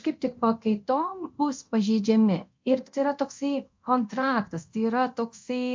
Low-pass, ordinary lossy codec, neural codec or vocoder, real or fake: 7.2 kHz; MP3, 48 kbps; codec, 24 kHz, 0.9 kbps, DualCodec; fake